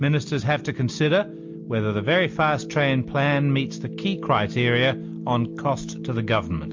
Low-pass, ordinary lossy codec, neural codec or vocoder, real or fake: 7.2 kHz; MP3, 48 kbps; none; real